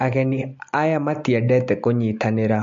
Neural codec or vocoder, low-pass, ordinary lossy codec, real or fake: none; 7.2 kHz; MP3, 48 kbps; real